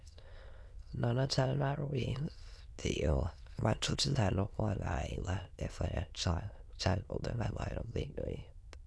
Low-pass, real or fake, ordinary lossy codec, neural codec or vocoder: none; fake; none; autoencoder, 22.05 kHz, a latent of 192 numbers a frame, VITS, trained on many speakers